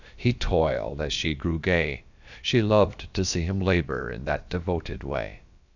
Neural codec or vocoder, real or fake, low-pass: codec, 16 kHz, about 1 kbps, DyCAST, with the encoder's durations; fake; 7.2 kHz